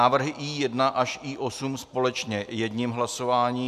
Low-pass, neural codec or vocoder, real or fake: 14.4 kHz; none; real